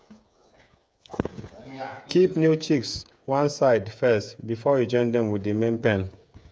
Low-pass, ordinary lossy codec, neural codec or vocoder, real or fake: none; none; codec, 16 kHz, 8 kbps, FreqCodec, smaller model; fake